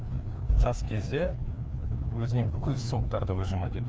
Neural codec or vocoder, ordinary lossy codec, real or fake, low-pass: codec, 16 kHz, 2 kbps, FreqCodec, larger model; none; fake; none